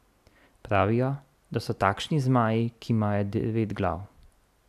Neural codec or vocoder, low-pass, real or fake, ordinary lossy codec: none; 14.4 kHz; real; none